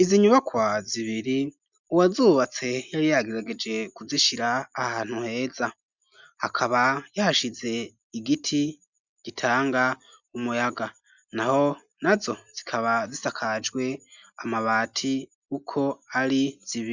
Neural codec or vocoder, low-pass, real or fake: none; 7.2 kHz; real